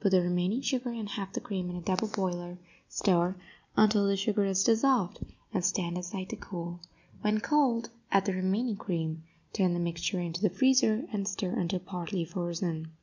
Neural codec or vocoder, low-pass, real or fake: none; 7.2 kHz; real